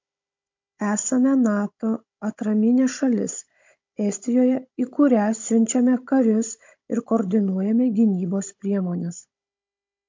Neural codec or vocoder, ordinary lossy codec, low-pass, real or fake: codec, 16 kHz, 16 kbps, FunCodec, trained on Chinese and English, 50 frames a second; MP3, 48 kbps; 7.2 kHz; fake